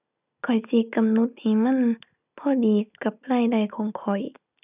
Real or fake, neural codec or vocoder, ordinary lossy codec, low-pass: real; none; none; 3.6 kHz